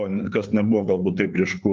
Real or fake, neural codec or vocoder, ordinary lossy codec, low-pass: fake; codec, 16 kHz, 4 kbps, FreqCodec, larger model; Opus, 24 kbps; 7.2 kHz